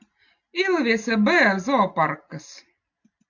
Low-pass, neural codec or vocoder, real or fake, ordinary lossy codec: 7.2 kHz; none; real; AAC, 48 kbps